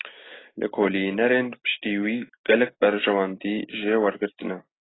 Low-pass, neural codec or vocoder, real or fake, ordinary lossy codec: 7.2 kHz; none; real; AAC, 16 kbps